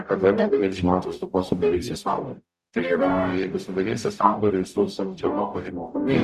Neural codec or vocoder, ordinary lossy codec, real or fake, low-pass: codec, 44.1 kHz, 0.9 kbps, DAC; Opus, 64 kbps; fake; 14.4 kHz